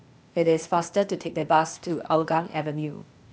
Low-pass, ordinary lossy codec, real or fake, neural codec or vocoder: none; none; fake; codec, 16 kHz, 0.8 kbps, ZipCodec